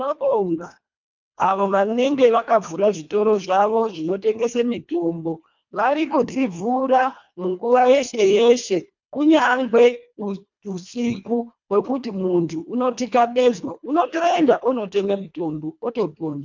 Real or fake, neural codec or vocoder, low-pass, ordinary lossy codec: fake; codec, 24 kHz, 1.5 kbps, HILCodec; 7.2 kHz; MP3, 64 kbps